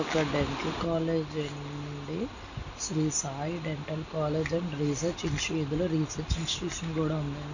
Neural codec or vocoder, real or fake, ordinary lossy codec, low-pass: none; real; none; 7.2 kHz